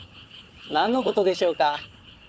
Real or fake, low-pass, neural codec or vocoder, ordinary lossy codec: fake; none; codec, 16 kHz, 4 kbps, FunCodec, trained on Chinese and English, 50 frames a second; none